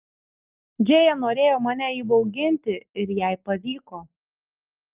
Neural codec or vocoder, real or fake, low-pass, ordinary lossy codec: none; real; 3.6 kHz; Opus, 32 kbps